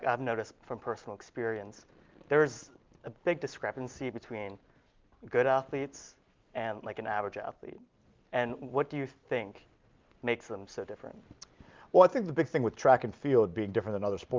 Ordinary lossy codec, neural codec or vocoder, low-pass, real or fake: Opus, 32 kbps; none; 7.2 kHz; real